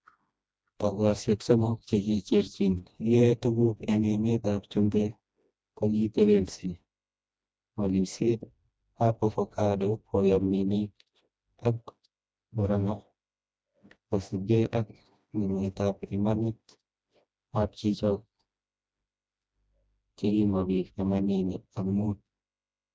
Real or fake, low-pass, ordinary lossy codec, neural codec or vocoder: fake; none; none; codec, 16 kHz, 1 kbps, FreqCodec, smaller model